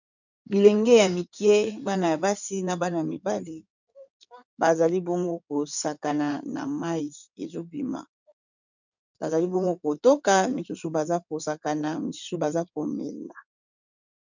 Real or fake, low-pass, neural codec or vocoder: fake; 7.2 kHz; codec, 16 kHz in and 24 kHz out, 2.2 kbps, FireRedTTS-2 codec